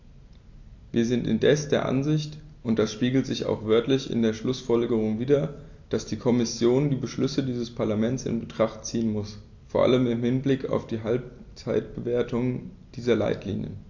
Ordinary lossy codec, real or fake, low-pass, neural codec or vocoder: AAC, 48 kbps; real; 7.2 kHz; none